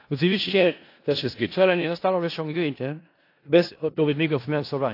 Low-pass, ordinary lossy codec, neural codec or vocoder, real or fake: 5.4 kHz; AAC, 32 kbps; codec, 16 kHz in and 24 kHz out, 0.4 kbps, LongCat-Audio-Codec, four codebook decoder; fake